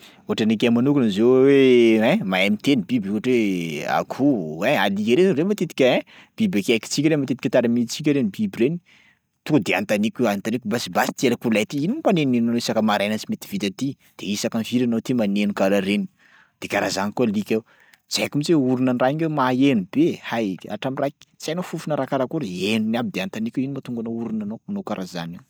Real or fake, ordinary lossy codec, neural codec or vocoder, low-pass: real; none; none; none